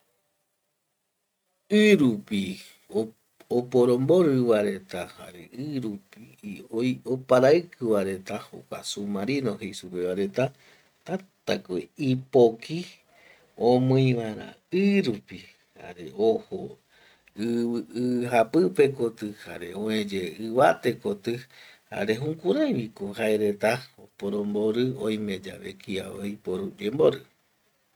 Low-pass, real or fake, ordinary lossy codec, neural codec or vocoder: 19.8 kHz; real; none; none